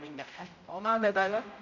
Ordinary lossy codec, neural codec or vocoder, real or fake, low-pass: none; codec, 16 kHz, 0.5 kbps, X-Codec, HuBERT features, trained on general audio; fake; 7.2 kHz